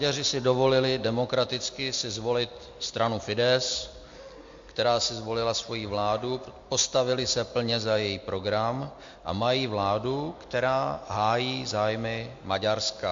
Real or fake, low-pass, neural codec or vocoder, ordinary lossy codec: real; 7.2 kHz; none; MP3, 48 kbps